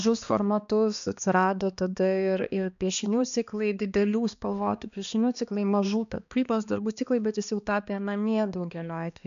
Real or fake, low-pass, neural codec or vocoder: fake; 7.2 kHz; codec, 16 kHz, 2 kbps, X-Codec, HuBERT features, trained on balanced general audio